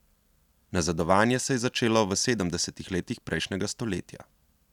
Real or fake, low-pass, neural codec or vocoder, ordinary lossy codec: real; 19.8 kHz; none; none